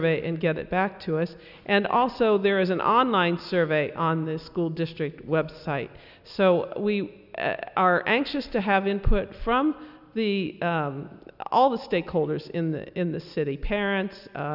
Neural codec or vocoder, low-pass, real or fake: none; 5.4 kHz; real